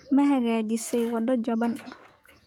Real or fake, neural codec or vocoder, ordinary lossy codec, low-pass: fake; codec, 44.1 kHz, 7.8 kbps, DAC; none; 19.8 kHz